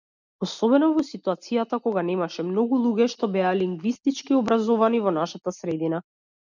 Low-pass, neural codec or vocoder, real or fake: 7.2 kHz; none; real